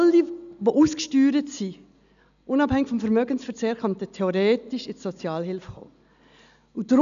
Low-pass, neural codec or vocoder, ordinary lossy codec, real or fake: 7.2 kHz; none; none; real